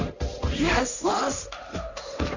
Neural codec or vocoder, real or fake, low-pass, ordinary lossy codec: codec, 16 kHz, 1.1 kbps, Voila-Tokenizer; fake; 7.2 kHz; none